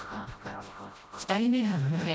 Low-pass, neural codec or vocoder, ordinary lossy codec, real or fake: none; codec, 16 kHz, 0.5 kbps, FreqCodec, smaller model; none; fake